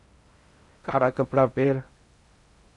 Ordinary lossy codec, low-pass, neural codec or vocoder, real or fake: AAC, 64 kbps; 10.8 kHz; codec, 16 kHz in and 24 kHz out, 0.6 kbps, FocalCodec, streaming, 2048 codes; fake